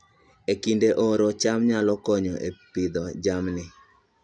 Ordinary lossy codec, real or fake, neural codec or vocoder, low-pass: none; real; none; 9.9 kHz